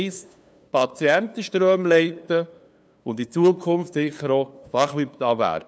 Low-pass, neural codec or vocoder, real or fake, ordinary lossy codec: none; codec, 16 kHz, 2 kbps, FunCodec, trained on LibriTTS, 25 frames a second; fake; none